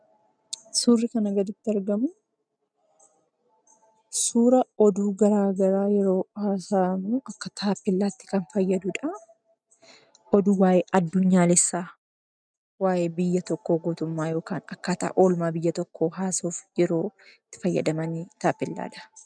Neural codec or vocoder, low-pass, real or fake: none; 9.9 kHz; real